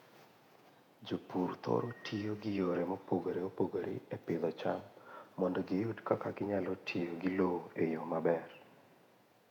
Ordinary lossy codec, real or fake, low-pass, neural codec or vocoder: none; fake; 19.8 kHz; vocoder, 48 kHz, 128 mel bands, Vocos